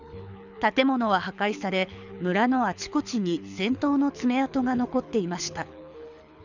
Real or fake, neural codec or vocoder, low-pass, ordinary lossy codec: fake; codec, 24 kHz, 6 kbps, HILCodec; 7.2 kHz; none